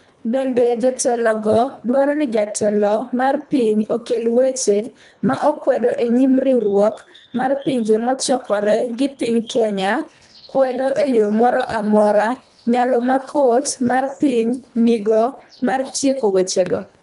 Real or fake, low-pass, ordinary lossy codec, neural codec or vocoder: fake; 10.8 kHz; none; codec, 24 kHz, 1.5 kbps, HILCodec